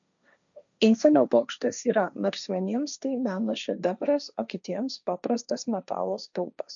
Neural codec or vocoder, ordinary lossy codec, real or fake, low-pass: codec, 16 kHz, 1.1 kbps, Voila-Tokenizer; MP3, 96 kbps; fake; 7.2 kHz